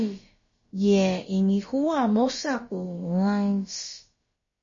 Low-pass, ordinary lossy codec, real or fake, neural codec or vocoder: 7.2 kHz; MP3, 32 kbps; fake; codec, 16 kHz, about 1 kbps, DyCAST, with the encoder's durations